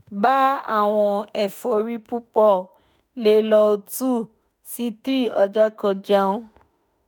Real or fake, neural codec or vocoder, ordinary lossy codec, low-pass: fake; autoencoder, 48 kHz, 32 numbers a frame, DAC-VAE, trained on Japanese speech; none; none